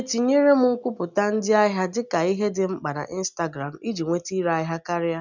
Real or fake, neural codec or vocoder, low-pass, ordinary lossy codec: real; none; 7.2 kHz; none